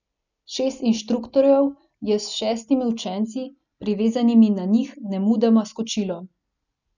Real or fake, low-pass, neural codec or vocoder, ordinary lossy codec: real; 7.2 kHz; none; none